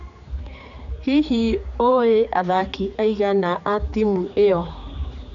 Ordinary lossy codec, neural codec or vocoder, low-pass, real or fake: none; codec, 16 kHz, 4 kbps, X-Codec, HuBERT features, trained on general audio; 7.2 kHz; fake